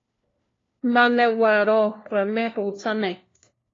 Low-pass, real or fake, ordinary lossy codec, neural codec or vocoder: 7.2 kHz; fake; AAC, 32 kbps; codec, 16 kHz, 1 kbps, FunCodec, trained on LibriTTS, 50 frames a second